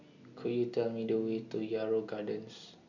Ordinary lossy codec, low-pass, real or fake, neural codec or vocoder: none; 7.2 kHz; real; none